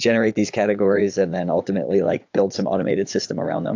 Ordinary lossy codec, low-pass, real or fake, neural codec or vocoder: AAC, 48 kbps; 7.2 kHz; fake; vocoder, 44.1 kHz, 80 mel bands, Vocos